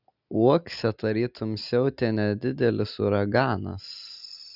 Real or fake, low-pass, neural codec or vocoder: real; 5.4 kHz; none